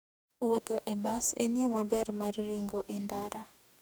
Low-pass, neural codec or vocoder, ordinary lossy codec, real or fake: none; codec, 44.1 kHz, 2.6 kbps, DAC; none; fake